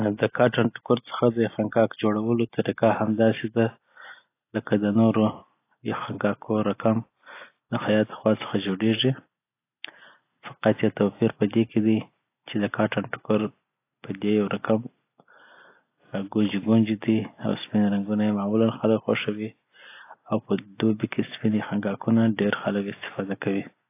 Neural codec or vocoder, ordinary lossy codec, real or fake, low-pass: none; AAC, 24 kbps; real; 3.6 kHz